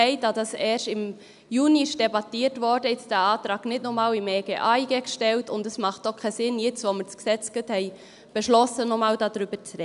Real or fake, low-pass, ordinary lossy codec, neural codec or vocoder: real; 10.8 kHz; none; none